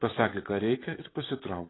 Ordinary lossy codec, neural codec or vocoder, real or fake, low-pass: AAC, 16 kbps; vocoder, 44.1 kHz, 128 mel bands, Pupu-Vocoder; fake; 7.2 kHz